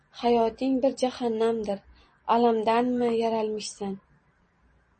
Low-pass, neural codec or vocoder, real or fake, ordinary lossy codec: 10.8 kHz; none; real; MP3, 32 kbps